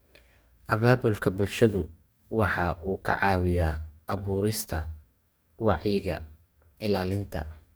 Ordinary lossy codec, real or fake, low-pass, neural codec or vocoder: none; fake; none; codec, 44.1 kHz, 2.6 kbps, DAC